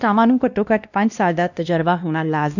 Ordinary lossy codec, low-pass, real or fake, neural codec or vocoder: none; 7.2 kHz; fake; codec, 16 kHz, 1 kbps, X-Codec, WavLM features, trained on Multilingual LibriSpeech